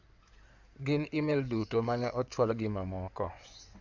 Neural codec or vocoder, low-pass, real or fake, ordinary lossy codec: codec, 16 kHz in and 24 kHz out, 2.2 kbps, FireRedTTS-2 codec; 7.2 kHz; fake; none